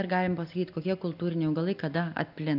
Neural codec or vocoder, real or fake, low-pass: none; real; 5.4 kHz